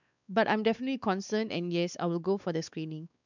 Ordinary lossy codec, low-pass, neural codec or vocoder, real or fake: none; 7.2 kHz; codec, 16 kHz, 4 kbps, X-Codec, WavLM features, trained on Multilingual LibriSpeech; fake